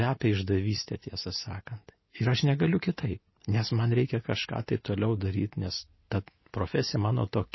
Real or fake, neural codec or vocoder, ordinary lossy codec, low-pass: real; none; MP3, 24 kbps; 7.2 kHz